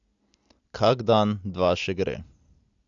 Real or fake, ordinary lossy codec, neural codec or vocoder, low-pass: real; AAC, 64 kbps; none; 7.2 kHz